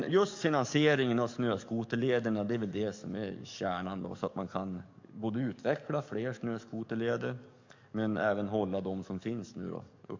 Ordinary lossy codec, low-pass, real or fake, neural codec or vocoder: MP3, 64 kbps; 7.2 kHz; fake; codec, 44.1 kHz, 7.8 kbps, DAC